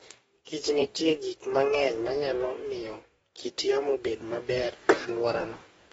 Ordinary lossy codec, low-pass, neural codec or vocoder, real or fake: AAC, 24 kbps; 19.8 kHz; codec, 44.1 kHz, 2.6 kbps, DAC; fake